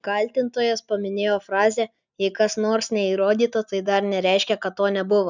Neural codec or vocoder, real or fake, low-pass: none; real; 7.2 kHz